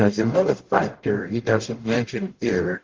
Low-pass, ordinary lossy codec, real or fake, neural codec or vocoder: 7.2 kHz; Opus, 16 kbps; fake; codec, 44.1 kHz, 0.9 kbps, DAC